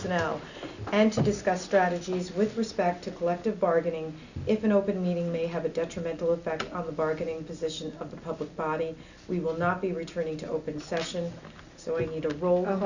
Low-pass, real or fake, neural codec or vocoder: 7.2 kHz; real; none